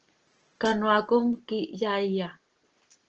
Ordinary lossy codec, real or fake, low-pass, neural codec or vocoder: Opus, 16 kbps; real; 7.2 kHz; none